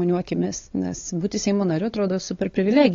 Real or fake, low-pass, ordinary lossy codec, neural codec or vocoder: fake; 7.2 kHz; AAC, 32 kbps; codec, 16 kHz, 8 kbps, FunCodec, trained on LibriTTS, 25 frames a second